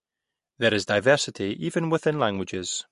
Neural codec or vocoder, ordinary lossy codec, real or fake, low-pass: none; MP3, 48 kbps; real; 14.4 kHz